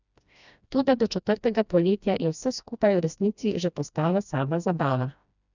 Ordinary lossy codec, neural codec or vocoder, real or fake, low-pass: none; codec, 16 kHz, 1 kbps, FreqCodec, smaller model; fake; 7.2 kHz